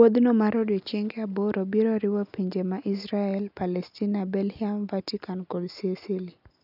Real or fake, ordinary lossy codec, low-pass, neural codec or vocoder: real; none; 5.4 kHz; none